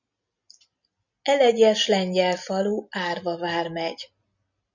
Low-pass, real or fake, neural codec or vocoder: 7.2 kHz; real; none